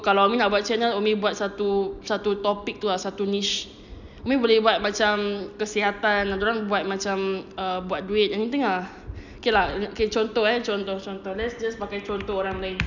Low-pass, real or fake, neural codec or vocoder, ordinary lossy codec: 7.2 kHz; real; none; none